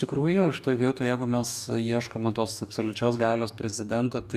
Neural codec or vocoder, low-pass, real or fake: codec, 44.1 kHz, 2.6 kbps, DAC; 14.4 kHz; fake